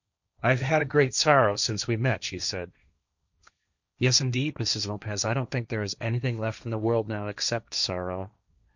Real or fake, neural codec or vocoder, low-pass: fake; codec, 16 kHz, 1.1 kbps, Voila-Tokenizer; 7.2 kHz